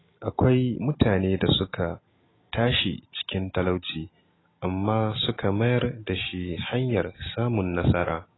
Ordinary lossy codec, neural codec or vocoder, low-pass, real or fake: AAC, 16 kbps; none; 7.2 kHz; real